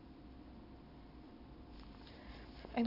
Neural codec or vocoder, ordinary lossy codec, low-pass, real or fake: none; none; 5.4 kHz; real